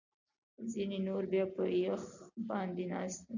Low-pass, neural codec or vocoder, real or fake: 7.2 kHz; none; real